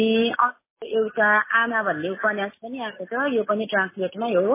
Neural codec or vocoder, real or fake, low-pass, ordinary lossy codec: none; real; 3.6 kHz; MP3, 16 kbps